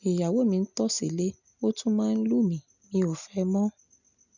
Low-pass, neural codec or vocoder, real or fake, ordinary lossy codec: 7.2 kHz; none; real; none